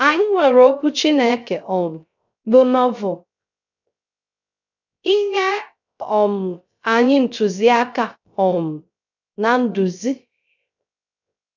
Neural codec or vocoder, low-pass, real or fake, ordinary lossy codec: codec, 16 kHz, 0.3 kbps, FocalCodec; 7.2 kHz; fake; none